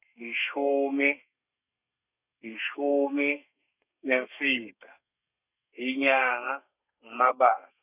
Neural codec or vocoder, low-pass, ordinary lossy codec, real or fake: codec, 32 kHz, 1.9 kbps, SNAC; 3.6 kHz; none; fake